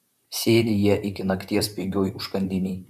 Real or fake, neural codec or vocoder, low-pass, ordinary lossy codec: fake; vocoder, 44.1 kHz, 128 mel bands, Pupu-Vocoder; 14.4 kHz; MP3, 96 kbps